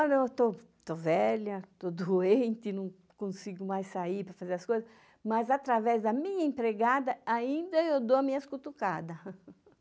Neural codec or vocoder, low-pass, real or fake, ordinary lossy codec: none; none; real; none